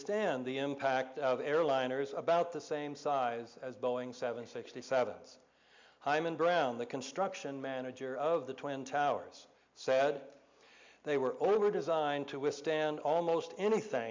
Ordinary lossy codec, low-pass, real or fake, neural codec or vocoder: MP3, 64 kbps; 7.2 kHz; real; none